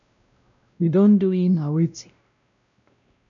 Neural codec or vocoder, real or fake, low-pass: codec, 16 kHz, 0.5 kbps, X-Codec, WavLM features, trained on Multilingual LibriSpeech; fake; 7.2 kHz